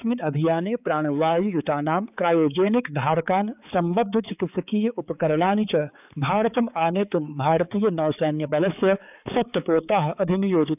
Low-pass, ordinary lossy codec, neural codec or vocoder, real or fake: 3.6 kHz; none; codec, 16 kHz, 4 kbps, X-Codec, HuBERT features, trained on balanced general audio; fake